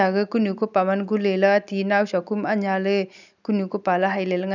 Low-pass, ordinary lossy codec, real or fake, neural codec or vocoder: 7.2 kHz; none; real; none